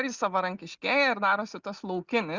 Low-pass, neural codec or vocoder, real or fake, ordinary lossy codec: 7.2 kHz; none; real; Opus, 64 kbps